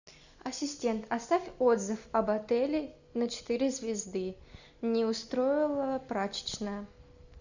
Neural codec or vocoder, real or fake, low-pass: none; real; 7.2 kHz